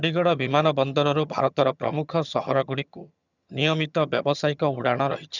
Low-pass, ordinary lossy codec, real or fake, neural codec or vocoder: 7.2 kHz; none; fake; vocoder, 22.05 kHz, 80 mel bands, HiFi-GAN